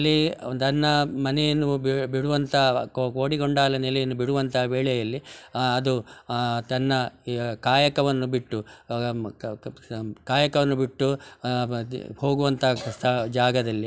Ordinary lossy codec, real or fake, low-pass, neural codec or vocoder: none; real; none; none